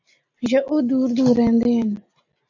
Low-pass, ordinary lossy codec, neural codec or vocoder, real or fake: 7.2 kHz; AAC, 48 kbps; none; real